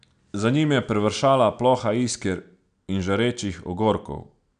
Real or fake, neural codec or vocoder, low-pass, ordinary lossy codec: real; none; 9.9 kHz; none